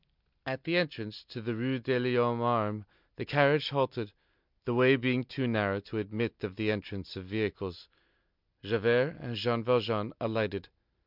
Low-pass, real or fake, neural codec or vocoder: 5.4 kHz; real; none